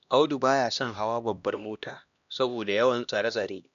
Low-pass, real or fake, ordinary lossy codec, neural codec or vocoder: 7.2 kHz; fake; none; codec, 16 kHz, 1 kbps, X-Codec, HuBERT features, trained on LibriSpeech